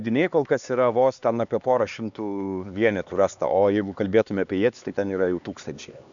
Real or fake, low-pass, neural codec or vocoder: fake; 7.2 kHz; codec, 16 kHz, 2 kbps, X-Codec, HuBERT features, trained on LibriSpeech